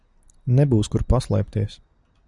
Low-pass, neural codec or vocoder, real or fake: 10.8 kHz; none; real